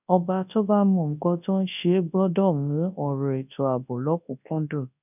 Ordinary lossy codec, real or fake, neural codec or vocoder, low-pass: none; fake; codec, 24 kHz, 0.9 kbps, WavTokenizer, large speech release; 3.6 kHz